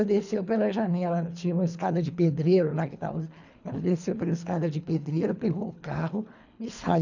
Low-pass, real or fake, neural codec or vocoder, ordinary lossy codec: 7.2 kHz; fake; codec, 24 kHz, 3 kbps, HILCodec; none